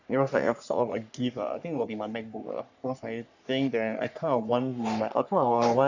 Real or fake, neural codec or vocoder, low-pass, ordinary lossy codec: fake; codec, 44.1 kHz, 3.4 kbps, Pupu-Codec; 7.2 kHz; none